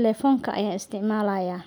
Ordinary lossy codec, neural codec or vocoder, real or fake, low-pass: none; none; real; none